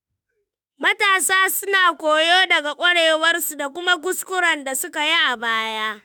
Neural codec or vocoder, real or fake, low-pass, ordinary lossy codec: autoencoder, 48 kHz, 32 numbers a frame, DAC-VAE, trained on Japanese speech; fake; none; none